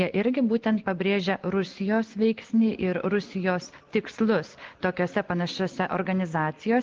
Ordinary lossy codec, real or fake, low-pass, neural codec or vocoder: Opus, 16 kbps; real; 7.2 kHz; none